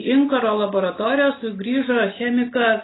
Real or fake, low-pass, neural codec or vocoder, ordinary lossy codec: real; 7.2 kHz; none; AAC, 16 kbps